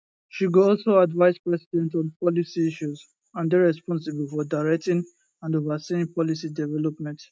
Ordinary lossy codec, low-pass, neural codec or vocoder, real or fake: none; none; none; real